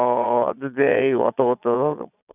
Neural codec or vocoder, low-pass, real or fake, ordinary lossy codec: vocoder, 22.05 kHz, 80 mel bands, Vocos; 3.6 kHz; fake; none